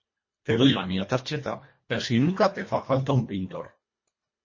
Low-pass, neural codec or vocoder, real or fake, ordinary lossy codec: 7.2 kHz; codec, 24 kHz, 1.5 kbps, HILCodec; fake; MP3, 32 kbps